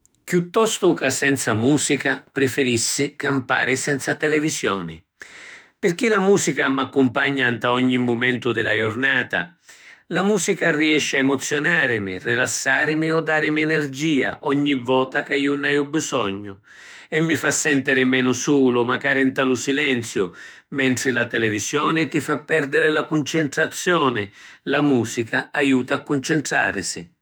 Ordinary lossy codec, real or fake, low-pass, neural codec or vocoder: none; fake; none; autoencoder, 48 kHz, 32 numbers a frame, DAC-VAE, trained on Japanese speech